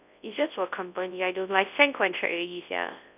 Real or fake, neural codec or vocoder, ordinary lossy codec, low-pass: fake; codec, 24 kHz, 0.9 kbps, WavTokenizer, large speech release; none; 3.6 kHz